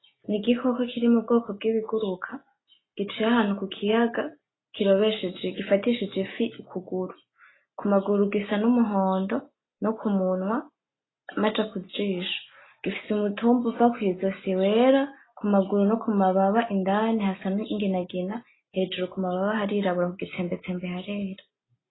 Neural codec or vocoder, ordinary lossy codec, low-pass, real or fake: none; AAC, 16 kbps; 7.2 kHz; real